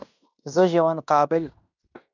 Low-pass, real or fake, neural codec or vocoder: 7.2 kHz; fake; codec, 16 kHz in and 24 kHz out, 0.9 kbps, LongCat-Audio-Codec, fine tuned four codebook decoder